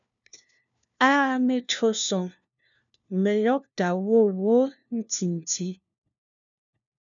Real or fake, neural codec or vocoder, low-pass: fake; codec, 16 kHz, 1 kbps, FunCodec, trained on LibriTTS, 50 frames a second; 7.2 kHz